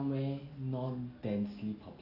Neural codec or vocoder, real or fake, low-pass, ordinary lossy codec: none; real; 5.4 kHz; none